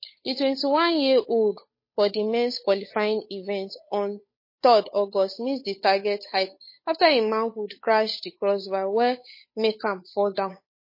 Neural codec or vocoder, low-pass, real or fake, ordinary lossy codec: codec, 16 kHz, 8 kbps, FunCodec, trained on Chinese and English, 25 frames a second; 5.4 kHz; fake; MP3, 24 kbps